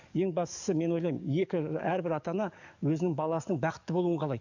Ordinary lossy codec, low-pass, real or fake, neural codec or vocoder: none; 7.2 kHz; fake; codec, 44.1 kHz, 7.8 kbps, DAC